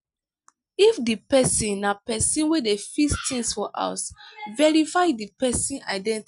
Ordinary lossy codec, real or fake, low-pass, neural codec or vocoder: none; real; 10.8 kHz; none